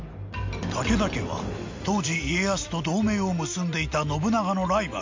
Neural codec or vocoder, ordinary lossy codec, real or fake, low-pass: none; MP3, 64 kbps; real; 7.2 kHz